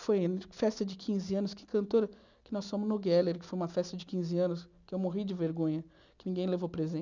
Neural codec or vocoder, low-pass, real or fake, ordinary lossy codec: none; 7.2 kHz; real; none